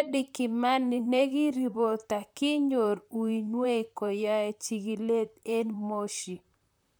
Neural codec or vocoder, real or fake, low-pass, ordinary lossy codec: vocoder, 44.1 kHz, 128 mel bands, Pupu-Vocoder; fake; none; none